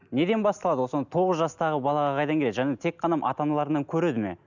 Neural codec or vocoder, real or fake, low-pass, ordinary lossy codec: none; real; 7.2 kHz; none